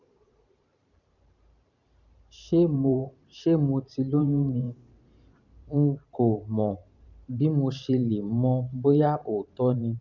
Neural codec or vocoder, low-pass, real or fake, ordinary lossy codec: vocoder, 44.1 kHz, 128 mel bands every 512 samples, BigVGAN v2; 7.2 kHz; fake; none